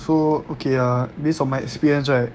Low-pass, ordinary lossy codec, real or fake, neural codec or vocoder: none; none; fake; codec, 16 kHz, 6 kbps, DAC